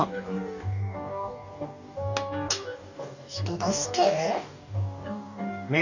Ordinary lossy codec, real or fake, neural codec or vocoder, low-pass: none; fake; codec, 44.1 kHz, 2.6 kbps, DAC; 7.2 kHz